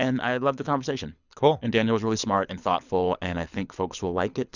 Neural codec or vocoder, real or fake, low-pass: codec, 24 kHz, 6 kbps, HILCodec; fake; 7.2 kHz